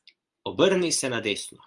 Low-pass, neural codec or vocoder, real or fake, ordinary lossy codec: 10.8 kHz; none; real; Opus, 32 kbps